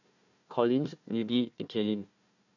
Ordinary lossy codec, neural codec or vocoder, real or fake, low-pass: none; codec, 16 kHz, 1 kbps, FunCodec, trained on Chinese and English, 50 frames a second; fake; 7.2 kHz